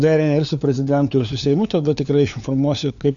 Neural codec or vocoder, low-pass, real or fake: codec, 16 kHz, 4 kbps, FunCodec, trained on LibriTTS, 50 frames a second; 7.2 kHz; fake